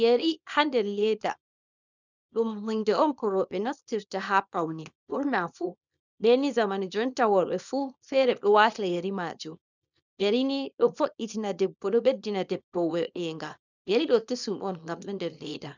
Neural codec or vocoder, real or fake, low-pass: codec, 24 kHz, 0.9 kbps, WavTokenizer, small release; fake; 7.2 kHz